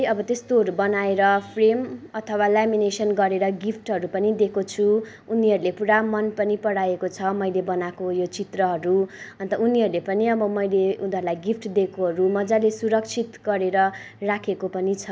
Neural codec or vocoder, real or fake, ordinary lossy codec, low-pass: none; real; none; none